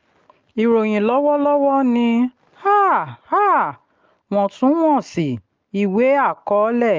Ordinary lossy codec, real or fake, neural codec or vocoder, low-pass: Opus, 24 kbps; real; none; 7.2 kHz